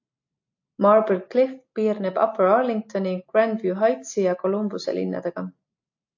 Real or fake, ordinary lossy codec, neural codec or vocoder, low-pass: real; AAC, 48 kbps; none; 7.2 kHz